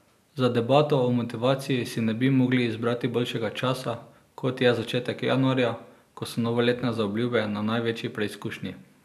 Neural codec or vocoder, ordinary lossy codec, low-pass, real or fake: none; none; 14.4 kHz; real